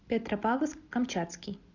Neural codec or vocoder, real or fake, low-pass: none; real; 7.2 kHz